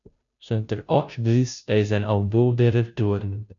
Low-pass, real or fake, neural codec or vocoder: 7.2 kHz; fake; codec, 16 kHz, 0.5 kbps, FunCodec, trained on Chinese and English, 25 frames a second